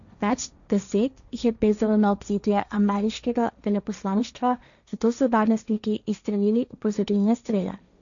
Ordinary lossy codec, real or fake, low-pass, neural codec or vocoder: none; fake; 7.2 kHz; codec, 16 kHz, 1.1 kbps, Voila-Tokenizer